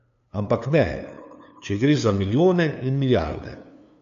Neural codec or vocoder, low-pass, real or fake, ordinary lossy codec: codec, 16 kHz, 2 kbps, FunCodec, trained on LibriTTS, 25 frames a second; 7.2 kHz; fake; AAC, 96 kbps